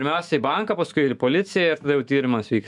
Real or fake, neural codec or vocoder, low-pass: fake; autoencoder, 48 kHz, 128 numbers a frame, DAC-VAE, trained on Japanese speech; 10.8 kHz